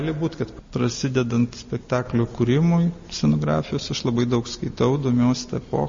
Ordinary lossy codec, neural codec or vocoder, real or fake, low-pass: MP3, 32 kbps; none; real; 7.2 kHz